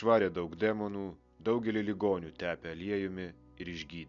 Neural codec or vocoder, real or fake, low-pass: none; real; 7.2 kHz